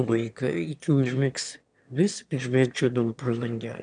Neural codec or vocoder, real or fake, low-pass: autoencoder, 22.05 kHz, a latent of 192 numbers a frame, VITS, trained on one speaker; fake; 9.9 kHz